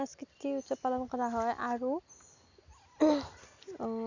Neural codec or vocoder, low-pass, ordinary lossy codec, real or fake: none; 7.2 kHz; none; real